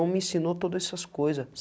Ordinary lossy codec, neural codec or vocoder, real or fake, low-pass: none; none; real; none